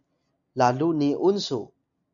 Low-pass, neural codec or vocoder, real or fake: 7.2 kHz; none; real